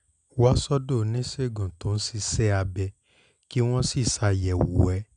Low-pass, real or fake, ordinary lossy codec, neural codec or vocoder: 9.9 kHz; real; none; none